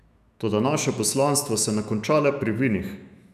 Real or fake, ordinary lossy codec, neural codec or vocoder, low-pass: fake; none; autoencoder, 48 kHz, 128 numbers a frame, DAC-VAE, trained on Japanese speech; 14.4 kHz